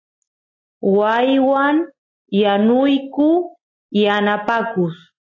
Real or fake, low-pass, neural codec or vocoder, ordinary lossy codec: real; 7.2 kHz; none; AAC, 32 kbps